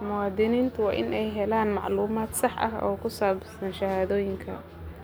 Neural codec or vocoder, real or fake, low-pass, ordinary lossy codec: none; real; none; none